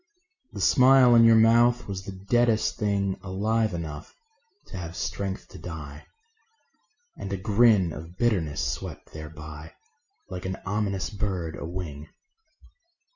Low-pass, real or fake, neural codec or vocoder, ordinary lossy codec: 7.2 kHz; real; none; Opus, 64 kbps